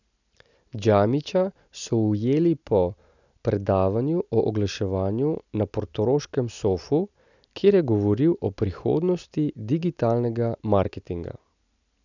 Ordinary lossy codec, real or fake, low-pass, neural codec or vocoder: none; real; 7.2 kHz; none